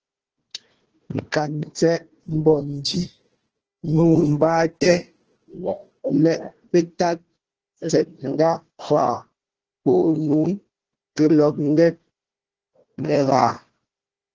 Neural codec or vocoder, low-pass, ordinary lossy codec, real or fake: codec, 16 kHz, 1 kbps, FunCodec, trained on Chinese and English, 50 frames a second; 7.2 kHz; Opus, 16 kbps; fake